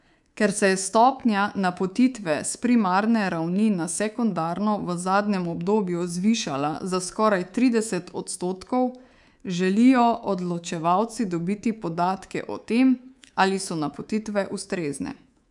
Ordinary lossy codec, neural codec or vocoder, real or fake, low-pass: none; codec, 24 kHz, 3.1 kbps, DualCodec; fake; 10.8 kHz